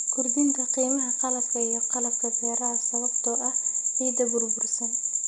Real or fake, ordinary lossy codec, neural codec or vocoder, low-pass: real; none; none; 9.9 kHz